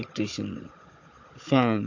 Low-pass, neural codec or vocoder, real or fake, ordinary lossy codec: 7.2 kHz; codec, 16 kHz, 16 kbps, FunCodec, trained on Chinese and English, 50 frames a second; fake; AAC, 48 kbps